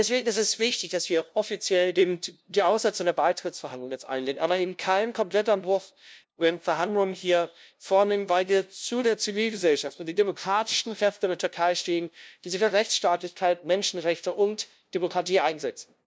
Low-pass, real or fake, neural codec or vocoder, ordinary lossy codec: none; fake; codec, 16 kHz, 0.5 kbps, FunCodec, trained on LibriTTS, 25 frames a second; none